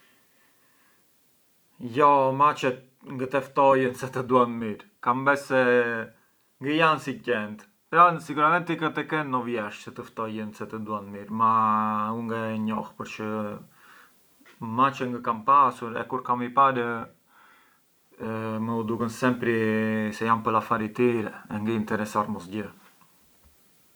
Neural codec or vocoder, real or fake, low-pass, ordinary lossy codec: none; real; none; none